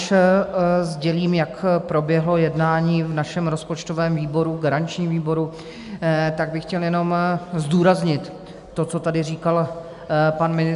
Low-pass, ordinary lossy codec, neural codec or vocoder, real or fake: 10.8 kHz; AAC, 96 kbps; none; real